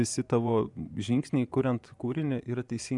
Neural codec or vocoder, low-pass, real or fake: vocoder, 24 kHz, 100 mel bands, Vocos; 10.8 kHz; fake